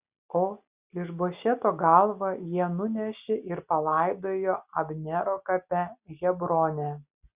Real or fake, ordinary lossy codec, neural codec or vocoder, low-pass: real; Opus, 64 kbps; none; 3.6 kHz